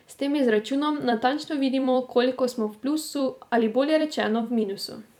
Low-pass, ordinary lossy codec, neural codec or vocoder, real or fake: 19.8 kHz; none; vocoder, 44.1 kHz, 128 mel bands every 256 samples, BigVGAN v2; fake